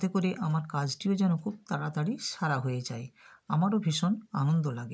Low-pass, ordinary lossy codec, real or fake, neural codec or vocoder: none; none; real; none